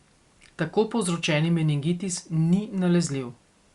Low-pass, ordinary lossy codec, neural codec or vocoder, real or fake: 10.8 kHz; Opus, 64 kbps; none; real